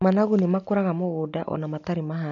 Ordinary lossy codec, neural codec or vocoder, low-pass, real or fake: none; none; 7.2 kHz; real